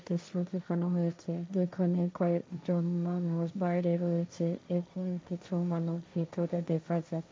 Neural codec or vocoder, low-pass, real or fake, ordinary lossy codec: codec, 16 kHz, 1.1 kbps, Voila-Tokenizer; none; fake; none